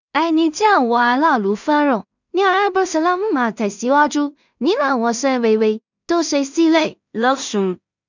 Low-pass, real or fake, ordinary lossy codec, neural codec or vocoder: 7.2 kHz; fake; none; codec, 16 kHz in and 24 kHz out, 0.4 kbps, LongCat-Audio-Codec, two codebook decoder